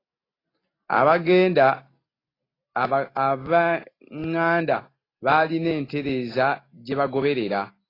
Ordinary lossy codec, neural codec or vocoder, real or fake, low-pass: AAC, 24 kbps; none; real; 5.4 kHz